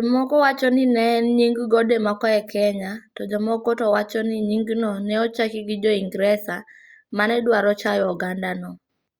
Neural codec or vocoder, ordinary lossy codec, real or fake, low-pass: none; Opus, 64 kbps; real; 19.8 kHz